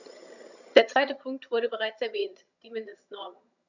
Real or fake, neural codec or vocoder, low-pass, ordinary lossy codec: fake; vocoder, 22.05 kHz, 80 mel bands, HiFi-GAN; 7.2 kHz; none